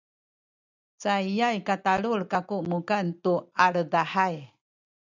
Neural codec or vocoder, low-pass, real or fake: none; 7.2 kHz; real